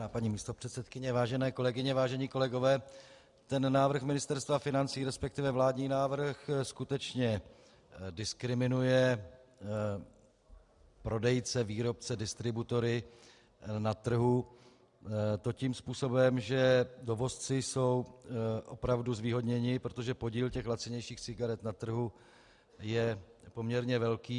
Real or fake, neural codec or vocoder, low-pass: real; none; 10.8 kHz